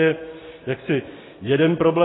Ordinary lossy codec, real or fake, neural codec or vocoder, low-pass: AAC, 16 kbps; real; none; 7.2 kHz